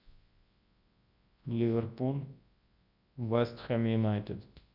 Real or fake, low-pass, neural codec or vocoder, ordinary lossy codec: fake; 5.4 kHz; codec, 24 kHz, 0.9 kbps, WavTokenizer, large speech release; Opus, 64 kbps